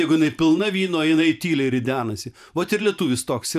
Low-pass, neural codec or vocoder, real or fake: 14.4 kHz; vocoder, 44.1 kHz, 128 mel bands every 512 samples, BigVGAN v2; fake